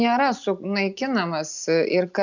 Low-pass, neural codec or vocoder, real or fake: 7.2 kHz; none; real